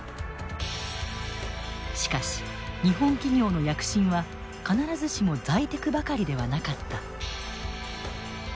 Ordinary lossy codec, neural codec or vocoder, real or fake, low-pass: none; none; real; none